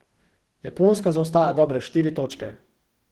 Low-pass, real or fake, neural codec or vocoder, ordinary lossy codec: 19.8 kHz; fake; codec, 44.1 kHz, 2.6 kbps, DAC; Opus, 16 kbps